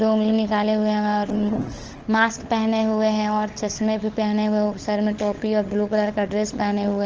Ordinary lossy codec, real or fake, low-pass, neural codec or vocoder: Opus, 16 kbps; fake; 7.2 kHz; codec, 16 kHz, 16 kbps, FunCodec, trained on LibriTTS, 50 frames a second